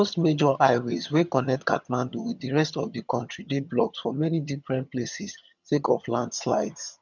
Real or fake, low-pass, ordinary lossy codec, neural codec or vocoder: fake; 7.2 kHz; none; vocoder, 22.05 kHz, 80 mel bands, HiFi-GAN